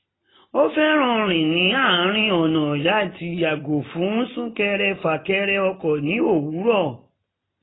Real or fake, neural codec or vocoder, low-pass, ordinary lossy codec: real; none; 7.2 kHz; AAC, 16 kbps